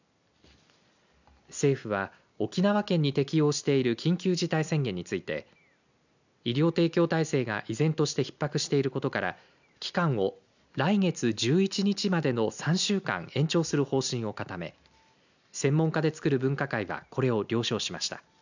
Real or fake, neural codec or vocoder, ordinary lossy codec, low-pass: real; none; none; 7.2 kHz